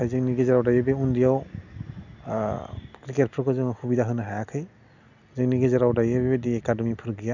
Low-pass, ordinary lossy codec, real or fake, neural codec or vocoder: 7.2 kHz; none; real; none